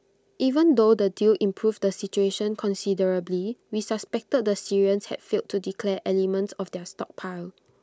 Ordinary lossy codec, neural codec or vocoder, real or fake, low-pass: none; none; real; none